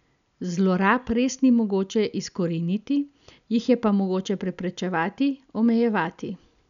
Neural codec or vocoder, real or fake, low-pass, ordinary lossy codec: none; real; 7.2 kHz; none